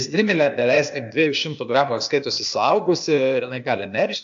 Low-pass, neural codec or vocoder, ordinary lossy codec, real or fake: 7.2 kHz; codec, 16 kHz, 0.8 kbps, ZipCodec; AAC, 64 kbps; fake